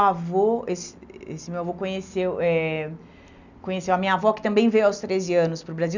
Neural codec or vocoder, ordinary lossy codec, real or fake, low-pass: none; none; real; 7.2 kHz